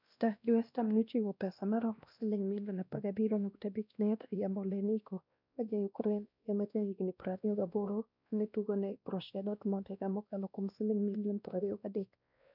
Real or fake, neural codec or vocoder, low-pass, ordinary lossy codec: fake; codec, 16 kHz, 1 kbps, X-Codec, WavLM features, trained on Multilingual LibriSpeech; 5.4 kHz; none